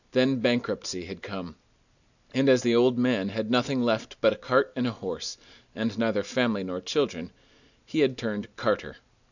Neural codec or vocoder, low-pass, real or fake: none; 7.2 kHz; real